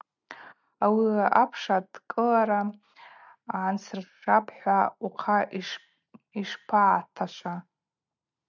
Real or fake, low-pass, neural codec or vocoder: real; 7.2 kHz; none